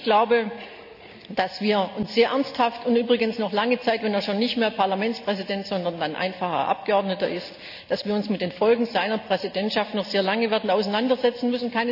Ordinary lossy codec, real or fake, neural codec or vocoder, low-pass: none; real; none; 5.4 kHz